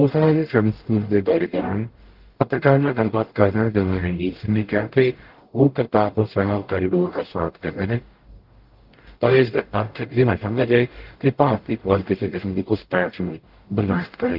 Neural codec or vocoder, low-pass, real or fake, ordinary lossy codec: codec, 44.1 kHz, 0.9 kbps, DAC; 5.4 kHz; fake; Opus, 16 kbps